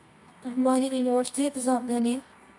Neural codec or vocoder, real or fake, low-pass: codec, 24 kHz, 0.9 kbps, WavTokenizer, medium music audio release; fake; 10.8 kHz